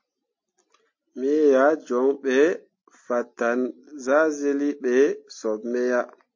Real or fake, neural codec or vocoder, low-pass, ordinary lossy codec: real; none; 7.2 kHz; MP3, 32 kbps